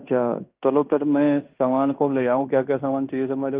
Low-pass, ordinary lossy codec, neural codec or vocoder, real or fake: 3.6 kHz; Opus, 24 kbps; codec, 16 kHz in and 24 kHz out, 0.9 kbps, LongCat-Audio-Codec, fine tuned four codebook decoder; fake